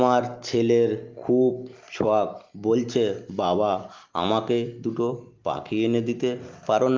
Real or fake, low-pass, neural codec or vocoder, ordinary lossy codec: real; 7.2 kHz; none; Opus, 32 kbps